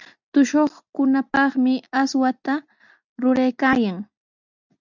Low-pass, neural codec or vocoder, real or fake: 7.2 kHz; none; real